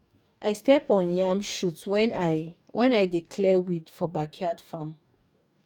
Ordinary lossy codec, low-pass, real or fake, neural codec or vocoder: none; 19.8 kHz; fake; codec, 44.1 kHz, 2.6 kbps, DAC